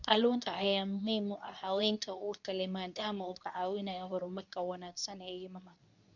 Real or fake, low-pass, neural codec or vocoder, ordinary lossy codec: fake; 7.2 kHz; codec, 24 kHz, 0.9 kbps, WavTokenizer, medium speech release version 2; none